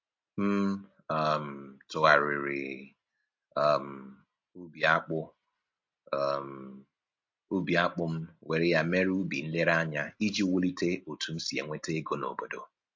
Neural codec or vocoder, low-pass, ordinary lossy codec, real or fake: none; 7.2 kHz; MP3, 48 kbps; real